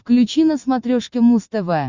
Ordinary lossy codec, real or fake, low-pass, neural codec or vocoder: Opus, 64 kbps; real; 7.2 kHz; none